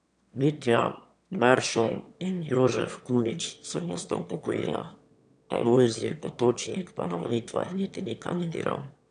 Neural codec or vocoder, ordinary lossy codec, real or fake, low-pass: autoencoder, 22.05 kHz, a latent of 192 numbers a frame, VITS, trained on one speaker; none; fake; 9.9 kHz